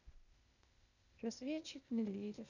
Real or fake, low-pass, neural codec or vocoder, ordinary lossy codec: fake; 7.2 kHz; codec, 16 kHz, 0.8 kbps, ZipCodec; none